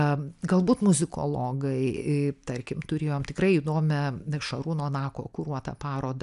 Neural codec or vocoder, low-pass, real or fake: none; 10.8 kHz; real